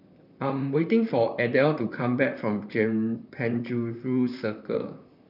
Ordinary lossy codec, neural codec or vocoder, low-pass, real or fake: none; vocoder, 44.1 kHz, 128 mel bands, Pupu-Vocoder; 5.4 kHz; fake